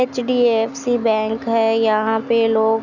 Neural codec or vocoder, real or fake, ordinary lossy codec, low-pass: none; real; none; 7.2 kHz